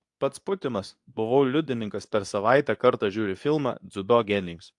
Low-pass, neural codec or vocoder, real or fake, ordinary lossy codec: 10.8 kHz; codec, 24 kHz, 0.9 kbps, WavTokenizer, medium speech release version 2; fake; AAC, 64 kbps